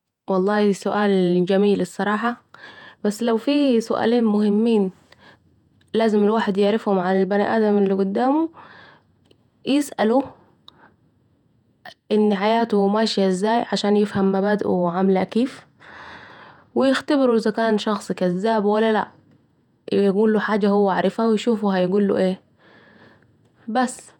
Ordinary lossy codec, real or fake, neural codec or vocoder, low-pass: none; fake; vocoder, 48 kHz, 128 mel bands, Vocos; 19.8 kHz